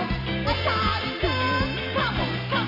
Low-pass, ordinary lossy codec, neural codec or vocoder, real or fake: 5.4 kHz; none; none; real